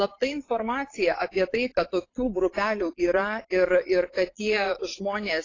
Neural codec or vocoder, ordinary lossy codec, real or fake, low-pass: vocoder, 22.05 kHz, 80 mel bands, Vocos; AAC, 32 kbps; fake; 7.2 kHz